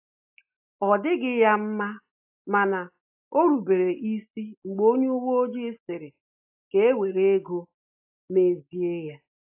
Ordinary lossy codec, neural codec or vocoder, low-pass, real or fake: AAC, 32 kbps; none; 3.6 kHz; real